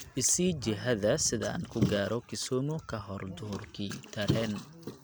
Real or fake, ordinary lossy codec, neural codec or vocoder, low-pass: real; none; none; none